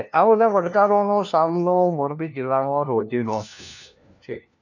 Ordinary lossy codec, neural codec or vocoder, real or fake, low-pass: none; codec, 16 kHz, 1 kbps, FunCodec, trained on LibriTTS, 50 frames a second; fake; 7.2 kHz